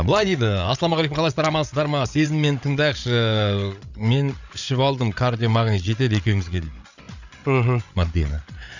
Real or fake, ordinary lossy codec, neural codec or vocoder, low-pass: fake; none; codec, 16 kHz, 8 kbps, FreqCodec, larger model; 7.2 kHz